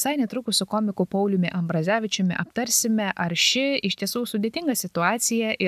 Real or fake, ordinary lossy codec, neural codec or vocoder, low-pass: real; AAC, 96 kbps; none; 14.4 kHz